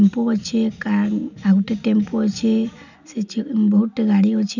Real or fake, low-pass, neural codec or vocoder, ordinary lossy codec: real; 7.2 kHz; none; none